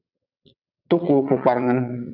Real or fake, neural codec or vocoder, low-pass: fake; vocoder, 22.05 kHz, 80 mel bands, WaveNeXt; 5.4 kHz